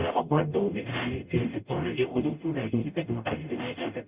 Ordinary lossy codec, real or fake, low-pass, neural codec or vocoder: Opus, 64 kbps; fake; 3.6 kHz; codec, 44.1 kHz, 0.9 kbps, DAC